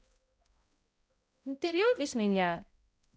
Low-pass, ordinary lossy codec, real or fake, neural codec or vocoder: none; none; fake; codec, 16 kHz, 0.5 kbps, X-Codec, HuBERT features, trained on balanced general audio